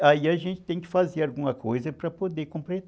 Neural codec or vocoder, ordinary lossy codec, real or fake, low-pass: none; none; real; none